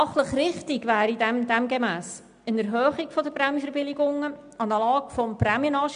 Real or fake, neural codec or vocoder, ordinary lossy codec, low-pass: real; none; AAC, 96 kbps; 9.9 kHz